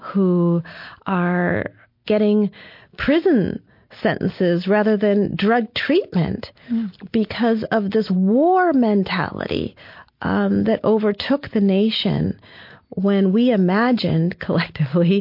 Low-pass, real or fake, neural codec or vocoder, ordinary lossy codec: 5.4 kHz; real; none; MP3, 32 kbps